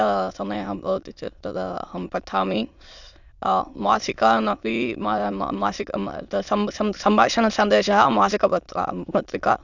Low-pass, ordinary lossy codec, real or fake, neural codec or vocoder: 7.2 kHz; none; fake; autoencoder, 22.05 kHz, a latent of 192 numbers a frame, VITS, trained on many speakers